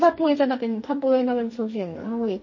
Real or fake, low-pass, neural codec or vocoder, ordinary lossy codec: fake; 7.2 kHz; codec, 44.1 kHz, 2.6 kbps, SNAC; MP3, 32 kbps